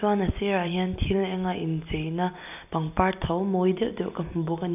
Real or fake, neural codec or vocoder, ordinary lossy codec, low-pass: real; none; none; 3.6 kHz